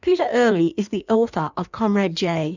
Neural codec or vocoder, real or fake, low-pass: codec, 16 kHz in and 24 kHz out, 1.1 kbps, FireRedTTS-2 codec; fake; 7.2 kHz